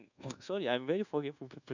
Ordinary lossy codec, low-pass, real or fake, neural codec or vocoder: none; 7.2 kHz; fake; codec, 24 kHz, 1.2 kbps, DualCodec